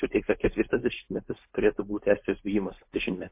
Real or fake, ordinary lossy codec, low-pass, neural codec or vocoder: fake; MP3, 24 kbps; 3.6 kHz; codec, 16 kHz, 4.8 kbps, FACodec